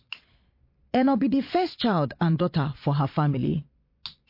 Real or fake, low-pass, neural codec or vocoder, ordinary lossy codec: fake; 5.4 kHz; vocoder, 22.05 kHz, 80 mel bands, Vocos; MP3, 32 kbps